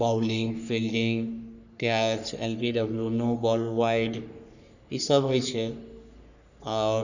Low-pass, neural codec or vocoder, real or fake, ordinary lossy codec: 7.2 kHz; codec, 44.1 kHz, 3.4 kbps, Pupu-Codec; fake; none